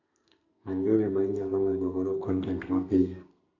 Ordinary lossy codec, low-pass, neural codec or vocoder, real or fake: none; 7.2 kHz; codec, 32 kHz, 1.9 kbps, SNAC; fake